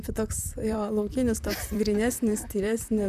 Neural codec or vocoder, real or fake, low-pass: vocoder, 44.1 kHz, 128 mel bands, Pupu-Vocoder; fake; 14.4 kHz